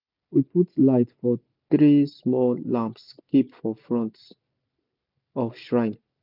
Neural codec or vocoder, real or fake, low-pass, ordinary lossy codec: none; real; 5.4 kHz; none